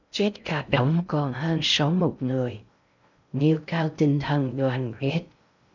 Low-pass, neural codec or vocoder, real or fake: 7.2 kHz; codec, 16 kHz in and 24 kHz out, 0.6 kbps, FocalCodec, streaming, 2048 codes; fake